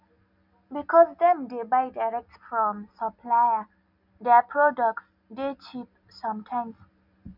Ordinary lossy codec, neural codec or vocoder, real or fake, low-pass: none; none; real; 5.4 kHz